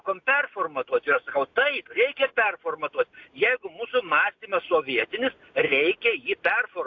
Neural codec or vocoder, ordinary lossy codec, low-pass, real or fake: none; AAC, 48 kbps; 7.2 kHz; real